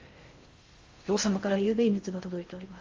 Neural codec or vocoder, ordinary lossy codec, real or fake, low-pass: codec, 16 kHz in and 24 kHz out, 0.6 kbps, FocalCodec, streaming, 2048 codes; Opus, 32 kbps; fake; 7.2 kHz